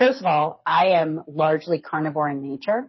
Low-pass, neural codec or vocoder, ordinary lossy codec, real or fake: 7.2 kHz; codec, 24 kHz, 6 kbps, HILCodec; MP3, 24 kbps; fake